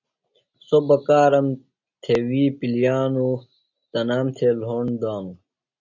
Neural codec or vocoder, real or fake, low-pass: none; real; 7.2 kHz